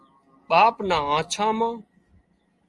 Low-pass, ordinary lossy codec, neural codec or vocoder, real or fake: 10.8 kHz; Opus, 32 kbps; none; real